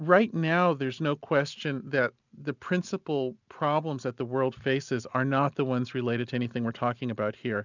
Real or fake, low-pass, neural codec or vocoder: real; 7.2 kHz; none